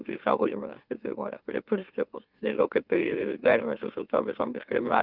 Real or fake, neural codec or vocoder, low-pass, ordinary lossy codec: fake; autoencoder, 44.1 kHz, a latent of 192 numbers a frame, MeloTTS; 5.4 kHz; Opus, 24 kbps